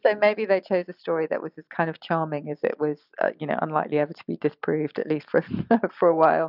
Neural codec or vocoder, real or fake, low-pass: none; real; 5.4 kHz